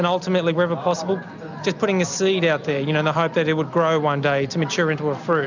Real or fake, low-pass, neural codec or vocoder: real; 7.2 kHz; none